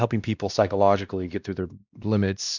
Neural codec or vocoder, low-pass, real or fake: codec, 16 kHz, 1 kbps, X-Codec, WavLM features, trained on Multilingual LibriSpeech; 7.2 kHz; fake